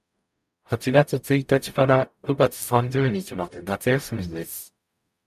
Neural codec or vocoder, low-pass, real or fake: codec, 44.1 kHz, 0.9 kbps, DAC; 14.4 kHz; fake